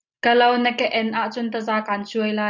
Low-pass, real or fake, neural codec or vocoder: 7.2 kHz; real; none